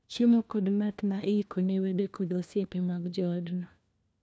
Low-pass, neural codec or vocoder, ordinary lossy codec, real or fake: none; codec, 16 kHz, 1 kbps, FunCodec, trained on LibriTTS, 50 frames a second; none; fake